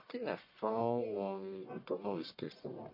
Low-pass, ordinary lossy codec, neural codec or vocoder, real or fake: 5.4 kHz; MP3, 32 kbps; codec, 44.1 kHz, 1.7 kbps, Pupu-Codec; fake